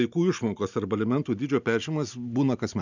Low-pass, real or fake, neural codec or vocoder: 7.2 kHz; real; none